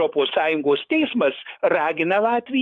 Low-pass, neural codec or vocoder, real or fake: 10.8 kHz; codec, 44.1 kHz, 7.8 kbps, DAC; fake